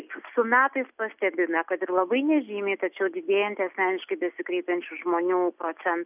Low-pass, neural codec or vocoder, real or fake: 3.6 kHz; none; real